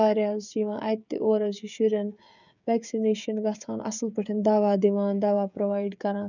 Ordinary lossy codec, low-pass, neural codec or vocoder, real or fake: none; 7.2 kHz; codec, 16 kHz, 16 kbps, FreqCodec, smaller model; fake